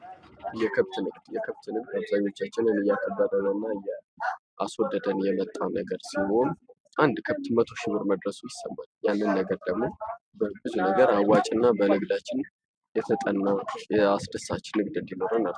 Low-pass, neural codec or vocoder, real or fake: 9.9 kHz; none; real